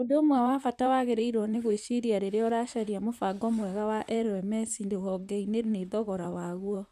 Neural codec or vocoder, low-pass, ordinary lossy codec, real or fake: vocoder, 44.1 kHz, 128 mel bands, Pupu-Vocoder; 14.4 kHz; none; fake